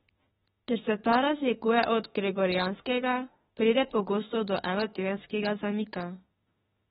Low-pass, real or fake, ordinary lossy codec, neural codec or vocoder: 19.8 kHz; fake; AAC, 16 kbps; autoencoder, 48 kHz, 32 numbers a frame, DAC-VAE, trained on Japanese speech